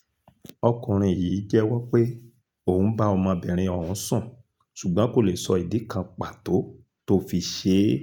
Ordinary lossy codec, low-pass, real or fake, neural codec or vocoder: none; 19.8 kHz; real; none